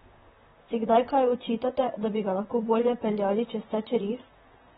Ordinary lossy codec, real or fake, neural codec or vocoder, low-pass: AAC, 16 kbps; fake; vocoder, 44.1 kHz, 128 mel bands, Pupu-Vocoder; 19.8 kHz